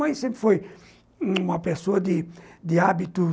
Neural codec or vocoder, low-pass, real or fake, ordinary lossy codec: none; none; real; none